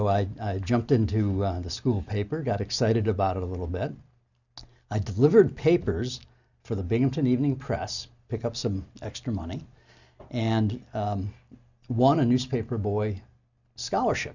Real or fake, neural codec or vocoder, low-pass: real; none; 7.2 kHz